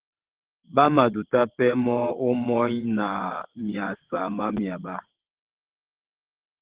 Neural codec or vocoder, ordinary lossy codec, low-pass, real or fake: vocoder, 22.05 kHz, 80 mel bands, WaveNeXt; Opus, 16 kbps; 3.6 kHz; fake